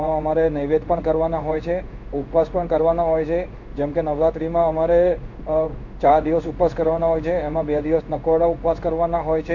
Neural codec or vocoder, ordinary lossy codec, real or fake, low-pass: codec, 16 kHz in and 24 kHz out, 1 kbps, XY-Tokenizer; none; fake; 7.2 kHz